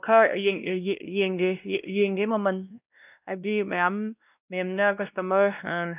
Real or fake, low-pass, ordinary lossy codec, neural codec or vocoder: fake; 3.6 kHz; none; codec, 16 kHz, 1 kbps, X-Codec, WavLM features, trained on Multilingual LibriSpeech